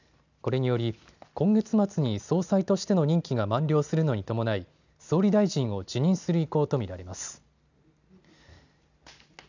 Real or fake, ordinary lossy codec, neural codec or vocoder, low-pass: real; none; none; 7.2 kHz